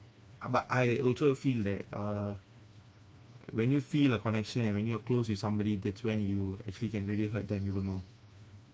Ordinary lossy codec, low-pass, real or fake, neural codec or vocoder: none; none; fake; codec, 16 kHz, 2 kbps, FreqCodec, smaller model